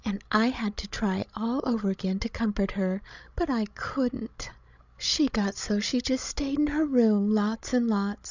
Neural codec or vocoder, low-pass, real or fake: codec, 16 kHz, 16 kbps, FreqCodec, larger model; 7.2 kHz; fake